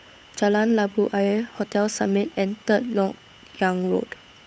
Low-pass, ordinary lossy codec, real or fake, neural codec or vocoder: none; none; fake; codec, 16 kHz, 8 kbps, FunCodec, trained on Chinese and English, 25 frames a second